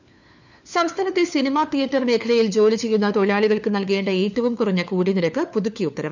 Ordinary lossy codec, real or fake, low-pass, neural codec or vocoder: none; fake; 7.2 kHz; codec, 16 kHz, 4 kbps, FunCodec, trained on LibriTTS, 50 frames a second